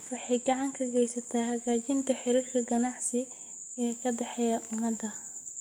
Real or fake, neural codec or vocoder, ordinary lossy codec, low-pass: fake; codec, 44.1 kHz, 7.8 kbps, DAC; none; none